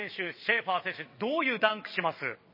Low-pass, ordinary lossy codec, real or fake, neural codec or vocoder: 5.4 kHz; MP3, 24 kbps; fake; vocoder, 22.05 kHz, 80 mel bands, WaveNeXt